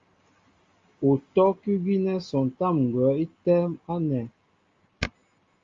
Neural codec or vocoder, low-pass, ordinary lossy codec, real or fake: none; 7.2 kHz; Opus, 32 kbps; real